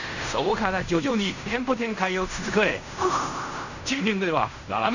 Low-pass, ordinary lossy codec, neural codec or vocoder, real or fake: 7.2 kHz; none; codec, 16 kHz in and 24 kHz out, 0.4 kbps, LongCat-Audio-Codec, fine tuned four codebook decoder; fake